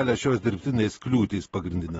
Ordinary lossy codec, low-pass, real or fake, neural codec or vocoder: AAC, 24 kbps; 10.8 kHz; real; none